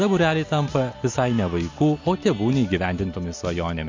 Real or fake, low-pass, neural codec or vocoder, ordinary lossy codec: real; 7.2 kHz; none; MP3, 48 kbps